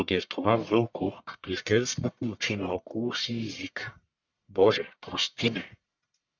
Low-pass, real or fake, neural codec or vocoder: 7.2 kHz; fake; codec, 44.1 kHz, 1.7 kbps, Pupu-Codec